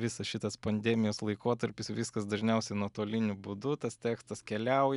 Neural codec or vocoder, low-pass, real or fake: none; 10.8 kHz; real